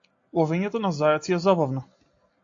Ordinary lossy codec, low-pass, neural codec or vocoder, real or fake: AAC, 48 kbps; 7.2 kHz; none; real